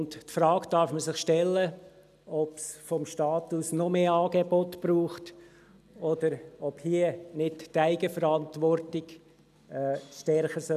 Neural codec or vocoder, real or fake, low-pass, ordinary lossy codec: none; real; 14.4 kHz; none